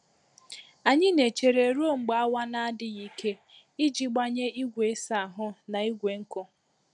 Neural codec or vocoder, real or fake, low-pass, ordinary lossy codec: none; real; 10.8 kHz; none